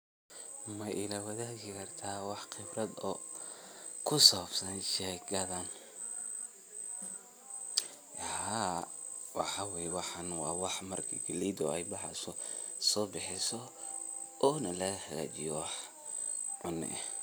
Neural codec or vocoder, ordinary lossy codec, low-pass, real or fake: none; none; none; real